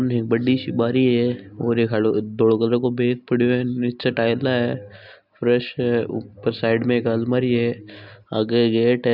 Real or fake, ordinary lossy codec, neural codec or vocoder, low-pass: real; none; none; 5.4 kHz